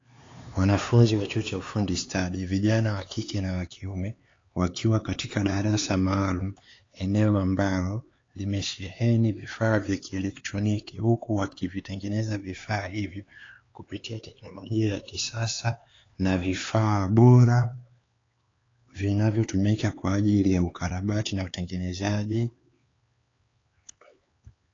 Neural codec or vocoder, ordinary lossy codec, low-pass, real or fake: codec, 16 kHz, 4 kbps, X-Codec, HuBERT features, trained on LibriSpeech; AAC, 32 kbps; 7.2 kHz; fake